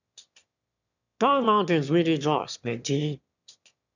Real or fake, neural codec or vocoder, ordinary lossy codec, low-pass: fake; autoencoder, 22.05 kHz, a latent of 192 numbers a frame, VITS, trained on one speaker; none; 7.2 kHz